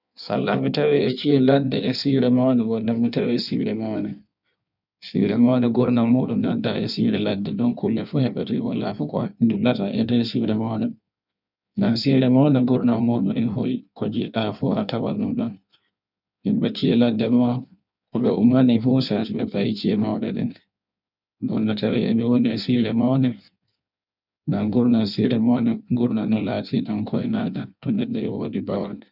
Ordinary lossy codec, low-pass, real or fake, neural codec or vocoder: none; 5.4 kHz; fake; codec, 16 kHz in and 24 kHz out, 1.1 kbps, FireRedTTS-2 codec